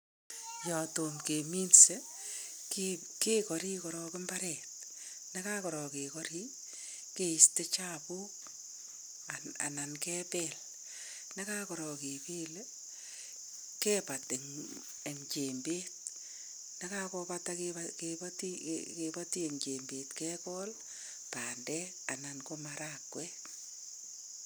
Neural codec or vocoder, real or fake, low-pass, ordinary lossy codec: none; real; none; none